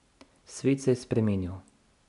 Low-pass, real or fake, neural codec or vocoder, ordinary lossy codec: 10.8 kHz; real; none; AAC, 96 kbps